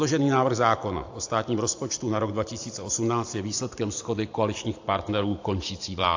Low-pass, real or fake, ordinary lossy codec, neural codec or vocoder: 7.2 kHz; fake; AAC, 48 kbps; vocoder, 44.1 kHz, 128 mel bands every 512 samples, BigVGAN v2